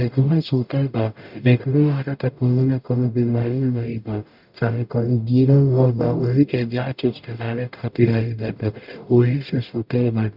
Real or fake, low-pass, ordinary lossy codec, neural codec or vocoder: fake; 5.4 kHz; none; codec, 44.1 kHz, 0.9 kbps, DAC